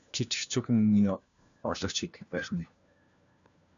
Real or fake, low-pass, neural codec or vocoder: fake; 7.2 kHz; codec, 16 kHz, 1 kbps, FunCodec, trained on LibriTTS, 50 frames a second